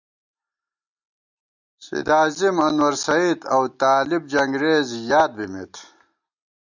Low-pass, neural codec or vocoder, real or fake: 7.2 kHz; none; real